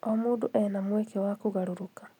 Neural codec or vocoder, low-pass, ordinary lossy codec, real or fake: none; 19.8 kHz; none; real